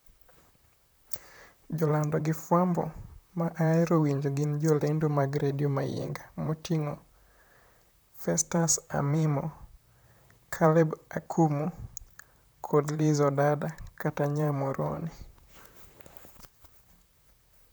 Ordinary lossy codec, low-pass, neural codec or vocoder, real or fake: none; none; vocoder, 44.1 kHz, 128 mel bands, Pupu-Vocoder; fake